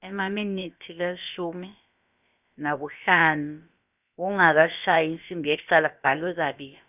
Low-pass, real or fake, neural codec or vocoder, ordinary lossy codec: 3.6 kHz; fake; codec, 16 kHz, about 1 kbps, DyCAST, with the encoder's durations; none